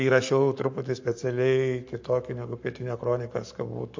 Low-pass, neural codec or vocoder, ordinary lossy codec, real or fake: 7.2 kHz; codec, 44.1 kHz, 7.8 kbps, Pupu-Codec; MP3, 48 kbps; fake